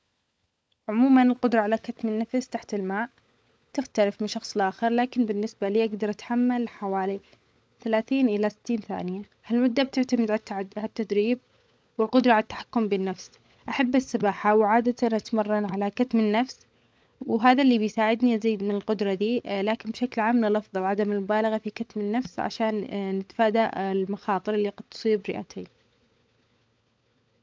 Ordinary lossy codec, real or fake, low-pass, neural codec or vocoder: none; fake; none; codec, 16 kHz, 16 kbps, FunCodec, trained on LibriTTS, 50 frames a second